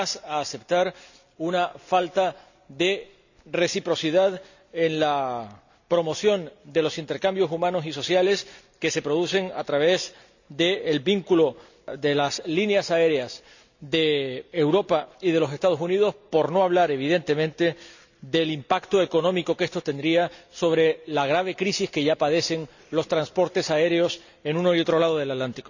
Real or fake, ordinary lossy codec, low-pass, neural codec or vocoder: real; none; 7.2 kHz; none